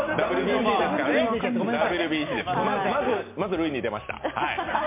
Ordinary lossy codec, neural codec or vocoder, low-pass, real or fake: MP3, 24 kbps; none; 3.6 kHz; real